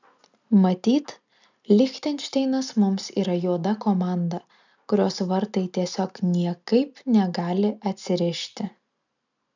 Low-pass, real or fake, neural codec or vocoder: 7.2 kHz; real; none